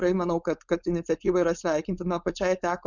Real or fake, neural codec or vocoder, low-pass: fake; codec, 16 kHz, 4.8 kbps, FACodec; 7.2 kHz